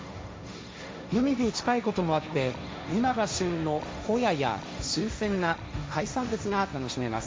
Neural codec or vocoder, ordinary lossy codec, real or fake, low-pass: codec, 16 kHz, 1.1 kbps, Voila-Tokenizer; none; fake; none